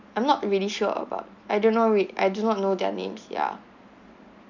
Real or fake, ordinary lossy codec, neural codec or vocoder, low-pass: real; none; none; 7.2 kHz